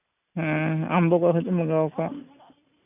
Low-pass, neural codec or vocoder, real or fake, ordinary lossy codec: 3.6 kHz; none; real; none